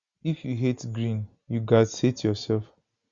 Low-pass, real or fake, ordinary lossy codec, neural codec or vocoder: 7.2 kHz; real; Opus, 64 kbps; none